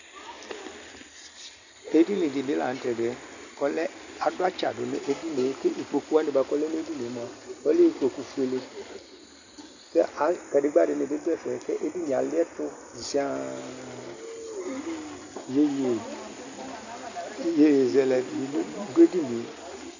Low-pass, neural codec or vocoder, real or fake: 7.2 kHz; none; real